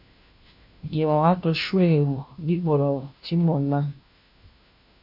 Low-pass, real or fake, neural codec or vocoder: 5.4 kHz; fake; codec, 16 kHz, 1 kbps, FunCodec, trained on LibriTTS, 50 frames a second